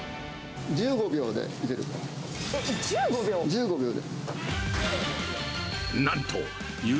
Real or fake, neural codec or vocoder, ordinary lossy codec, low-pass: real; none; none; none